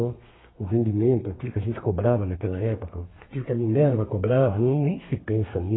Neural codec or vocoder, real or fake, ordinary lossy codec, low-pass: codec, 32 kHz, 1.9 kbps, SNAC; fake; AAC, 16 kbps; 7.2 kHz